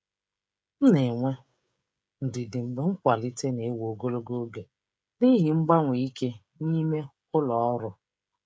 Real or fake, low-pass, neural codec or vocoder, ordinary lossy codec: fake; none; codec, 16 kHz, 16 kbps, FreqCodec, smaller model; none